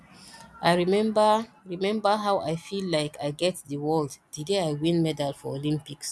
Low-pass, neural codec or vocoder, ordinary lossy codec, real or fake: none; none; none; real